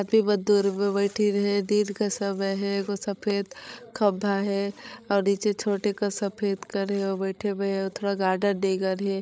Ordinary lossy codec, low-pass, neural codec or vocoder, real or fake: none; none; codec, 16 kHz, 16 kbps, FreqCodec, larger model; fake